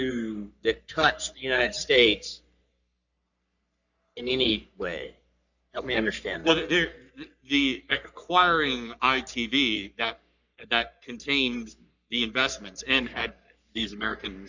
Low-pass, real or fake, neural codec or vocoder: 7.2 kHz; fake; codec, 44.1 kHz, 3.4 kbps, Pupu-Codec